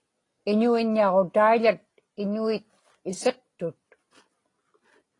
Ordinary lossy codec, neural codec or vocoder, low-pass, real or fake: AAC, 32 kbps; none; 10.8 kHz; real